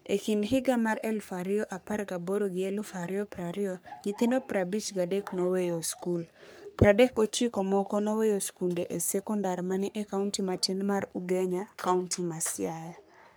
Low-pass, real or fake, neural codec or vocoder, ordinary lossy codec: none; fake; codec, 44.1 kHz, 3.4 kbps, Pupu-Codec; none